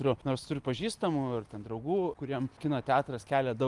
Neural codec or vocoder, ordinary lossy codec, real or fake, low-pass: none; Opus, 32 kbps; real; 10.8 kHz